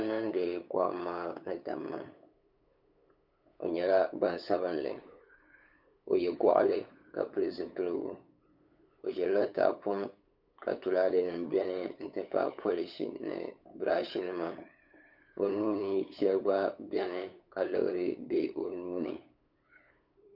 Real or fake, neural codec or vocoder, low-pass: fake; codec, 16 kHz, 8 kbps, FunCodec, trained on LibriTTS, 25 frames a second; 5.4 kHz